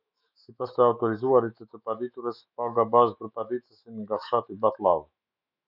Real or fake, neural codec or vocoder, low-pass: fake; autoencoder, 48 kHz, 128 numbers a frame, DAC-VAE, trained on Japanese speech; 5.4 kHz